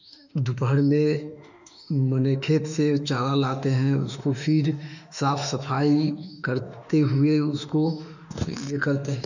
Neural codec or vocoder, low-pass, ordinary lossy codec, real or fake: autoencoder, 48 kHz, 32 numbers a frame, DAC-VAE, trained on Japanese speech; 7.2 kHz; none; fake